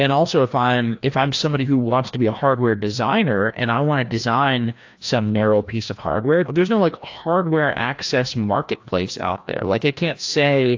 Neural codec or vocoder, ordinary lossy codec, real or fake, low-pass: codec, 16 kHz, 1 kbps, FreqCodec, larger model; AAC, 48 kbps; fake; 7.2 kHz